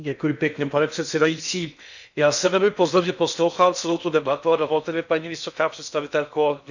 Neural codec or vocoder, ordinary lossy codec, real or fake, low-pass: codec, 16 kHz in and 24 kHz out, 0.6 kbps, FocalCodec, streaming, 2048 codes; none; fake; 7.2 kHz